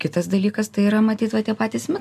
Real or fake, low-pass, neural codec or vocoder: real; 14.4 kHz; none